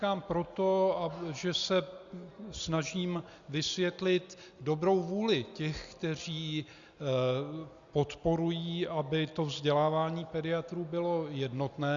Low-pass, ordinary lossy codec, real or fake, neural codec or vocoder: 7.2 kHz; Opus, 64 kbps; real; none